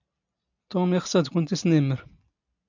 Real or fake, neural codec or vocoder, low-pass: real; none; 7.2 kHz